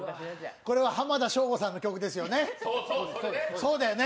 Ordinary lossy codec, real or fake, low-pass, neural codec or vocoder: none; real; none; none